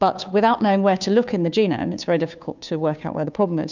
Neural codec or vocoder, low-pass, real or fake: codec, 16 kHz, 2 kbps, FunCodec, trained on Chinese and English, 25 frames a second; 7.2 kHz; fake